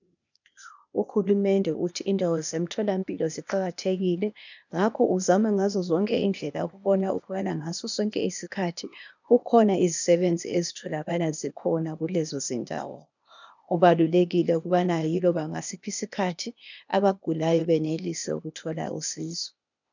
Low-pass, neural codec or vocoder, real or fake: 7.2 kHz; codec, 16 kHz, 0.8 kbps, ZipCodec; fake